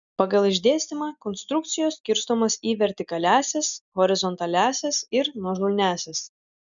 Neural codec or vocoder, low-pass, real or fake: none; 7.2 kHz; real